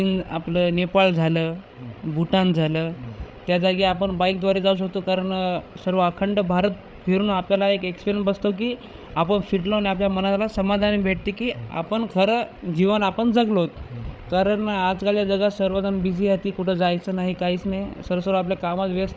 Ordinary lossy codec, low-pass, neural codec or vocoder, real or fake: none; none; codec, 16 kHz, 8 kbps, FreqCodec, larger model; fake